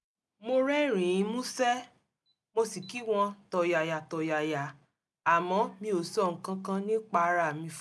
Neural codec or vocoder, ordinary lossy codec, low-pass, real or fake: none; none; none; real